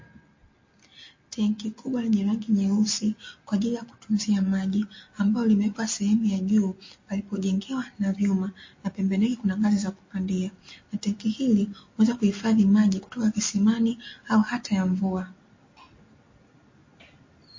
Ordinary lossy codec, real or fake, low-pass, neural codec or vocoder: MP3, 32 kbps; real; 7.2 kHz; none